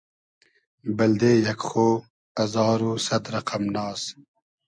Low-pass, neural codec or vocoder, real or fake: 9.9 kHz; none; real